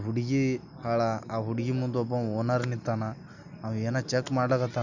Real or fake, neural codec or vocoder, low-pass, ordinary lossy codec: real; none; 7.2 kHz; none